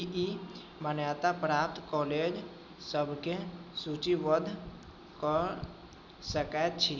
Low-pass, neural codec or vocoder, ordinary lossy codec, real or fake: none; none; none; real